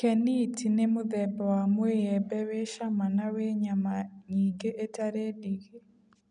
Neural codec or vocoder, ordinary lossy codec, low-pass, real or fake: none; none; 9.9 kHz; real